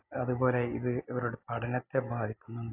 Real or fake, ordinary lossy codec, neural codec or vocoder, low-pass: real; AAC, 32 kbps; none; 3.6 kHz